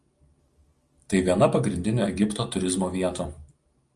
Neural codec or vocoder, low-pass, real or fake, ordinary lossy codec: none; 10.8 kHz; real; Opus, 32 kbps